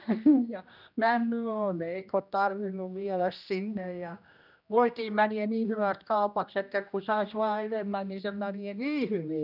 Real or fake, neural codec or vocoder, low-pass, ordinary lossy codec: fake; codec, 16 kHz, 1 kbps, X-Codec, HuBERT features, trained on general audio; 5.4 kHz; none